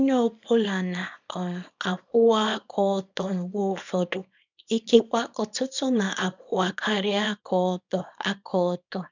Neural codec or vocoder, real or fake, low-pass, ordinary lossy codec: codec, 24 kHz, 0.9 kbps, WavTokenizer, small release; fake; 7.2 kHz; none